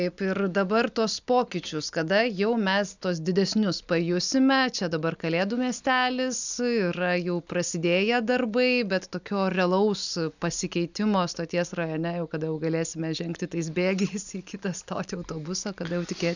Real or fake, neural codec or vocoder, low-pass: real; none; 7.2 kHz